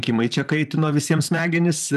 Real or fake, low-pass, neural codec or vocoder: fake; 14.4 kHz; vocoder, 44.1 kHz, 128 mel bands, Pupu-Vocoder